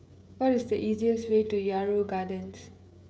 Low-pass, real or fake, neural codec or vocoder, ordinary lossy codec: none; fake; codec, 16 kHz, 16 kbps, FreqCodec, smaller model; none